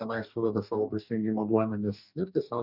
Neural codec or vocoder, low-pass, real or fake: codec, 44.1 kHz, 2.6 kbps, DAC; 5.4 kHz; fake